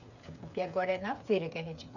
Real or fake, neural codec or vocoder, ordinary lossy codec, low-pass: fake; codec, 16 kHz, 8 kbps, FreqCodec, smaller model; none; 7.2 kHz